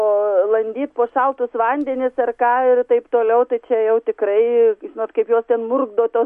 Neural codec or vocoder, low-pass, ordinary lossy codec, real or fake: none; 14.4 kHz; MP3, 48 kbps; real